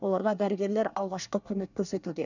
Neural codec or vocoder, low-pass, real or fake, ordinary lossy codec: codec, 24 kHz, 1 kbps, SNAC; 7.2 kHz; fake; none